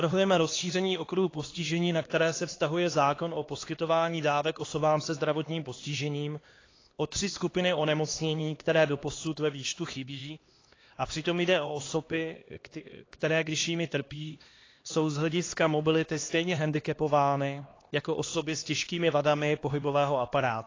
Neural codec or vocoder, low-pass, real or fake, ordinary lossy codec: codec, 16 kHz, 2 kbps, X-Codec, HuBERT features, trained on LibriSpeech; 7.2 kHz; fake; AAC, 32 kbps